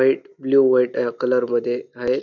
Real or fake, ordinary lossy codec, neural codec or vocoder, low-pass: real; none; none; 7.2 kHz